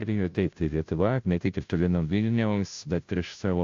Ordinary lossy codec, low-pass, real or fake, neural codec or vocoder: AAC, 64 kbps; 7.2 kHz; fake; codec, 16 kHz, 0.5 kbps, FunCodec, trained on Chinese and English, 25 frames a second